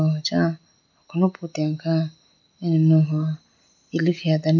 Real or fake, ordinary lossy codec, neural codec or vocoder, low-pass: real; none; none; 7.2 kHz